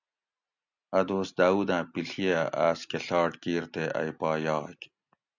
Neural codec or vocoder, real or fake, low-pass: none; real; 7.2 kHz